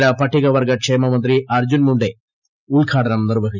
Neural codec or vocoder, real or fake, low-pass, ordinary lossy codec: none; real; 7.2 kHz; none